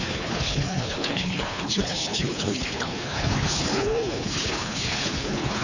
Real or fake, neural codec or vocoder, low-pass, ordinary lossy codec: fake; codec, 24 kHz, 3 kbps, HILCodec; 7.2 kHz; none